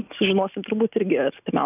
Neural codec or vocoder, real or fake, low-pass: none; real; 3.6 kHz